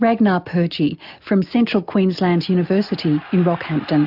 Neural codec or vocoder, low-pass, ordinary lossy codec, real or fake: none; 5.4 kHz; AAC, 48 kbps; real